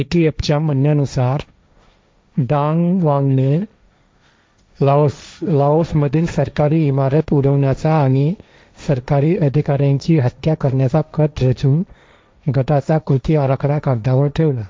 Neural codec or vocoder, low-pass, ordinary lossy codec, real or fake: codec, 16 kHz, 1.1 kbps, Voila-Tokenizer; none; none; fake